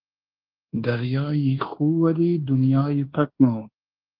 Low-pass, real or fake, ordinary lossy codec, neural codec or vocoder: 5.4 kHz; fake; Opus, 16 kbps; codec, 24 kHz, 1.2 kbps, DualCodec